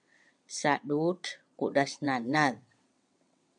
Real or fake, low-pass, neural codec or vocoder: fake; 9.9 kHz; vocoder, 22.05 kHz, 80 mel bands, WaveNeXt